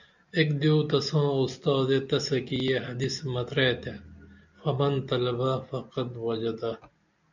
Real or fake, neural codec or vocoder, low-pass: real; none; 7.2 kHz